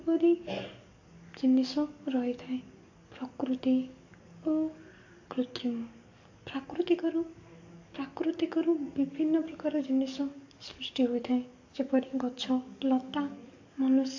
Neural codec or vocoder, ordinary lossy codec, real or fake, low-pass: codec, 44.1 kHz, 7.8 kbps, DAC; AAC, 48 kbps; fake; 7.2 kHz